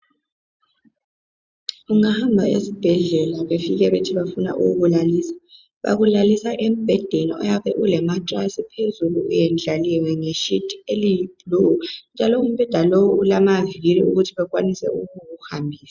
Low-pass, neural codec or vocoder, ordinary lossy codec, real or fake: 7.2 kHz; none; Opus, 64 kbps; real